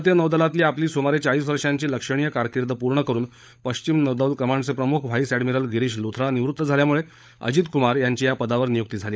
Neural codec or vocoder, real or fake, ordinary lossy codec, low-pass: codec, 16 kHz, 16 kbps, FunCodec, trained on LibriTTS, 50 frames a second; fake; none; none